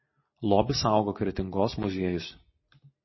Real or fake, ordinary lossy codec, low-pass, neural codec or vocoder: real; MP3, 24 kbps; 7.2 kHz; none